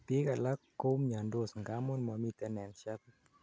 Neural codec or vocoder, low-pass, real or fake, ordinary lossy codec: none; none; real; none